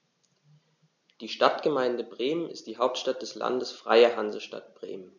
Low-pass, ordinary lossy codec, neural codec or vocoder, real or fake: 7.2 kHz; none; none; real